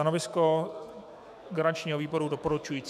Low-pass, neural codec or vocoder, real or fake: 14.4 kHz; autoencoder, 48 kHz, 128 numbers a frame, DAC-VAE, trained on Japanese speech; fake